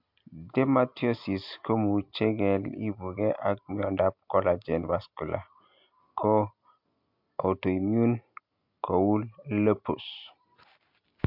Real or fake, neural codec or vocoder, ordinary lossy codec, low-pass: real; none; MP3, 48 kbps; 5.4 kHz